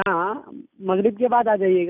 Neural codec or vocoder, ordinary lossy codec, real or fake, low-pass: none; none; real; 3.6 kHz